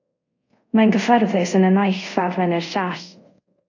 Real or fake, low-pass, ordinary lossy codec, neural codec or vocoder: fake; 7.2 kHz; AAC, 48 kbps; codec, 24 kHz, 0.5 kbps, DualCodec